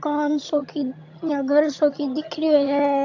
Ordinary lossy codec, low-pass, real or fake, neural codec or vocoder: AAC, 48 kbps; 7.2 kHz; fake; vocoder, 22.05 kHz, 80 mel bands, HiFi-GAN